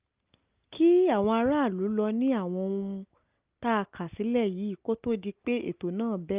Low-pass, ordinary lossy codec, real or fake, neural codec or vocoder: 3.6 kHz; Opus, 24 kbps; real; none